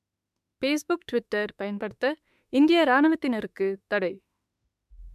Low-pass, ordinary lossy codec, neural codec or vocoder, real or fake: 14.4 kHz; MP3, 96 kbps; autoencoder, 48 kHz, 32 numbers a frame, DAC-VAE, trained on Japanese speech; fake